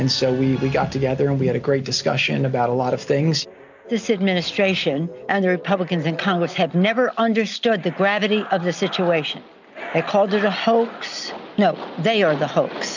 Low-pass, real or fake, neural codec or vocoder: 7.2 kHz; real; none